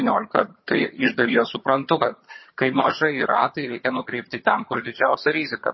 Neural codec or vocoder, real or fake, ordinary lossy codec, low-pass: vocoder, 22.05 kHz, 80 mel bands, HiFi-GAN; fake; MP3, 24 kbps; 7.2 kHz